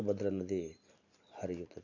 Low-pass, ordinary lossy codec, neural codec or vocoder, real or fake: 7.2 kHz; none; none; real